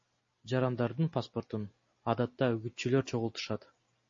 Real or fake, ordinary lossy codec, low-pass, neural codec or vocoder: real; MP3, 32 kbps; 7.2 kHz; none